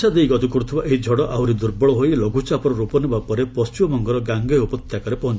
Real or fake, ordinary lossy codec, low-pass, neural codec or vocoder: real; none; none; none